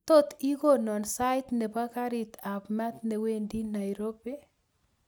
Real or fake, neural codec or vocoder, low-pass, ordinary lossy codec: real; none; none; none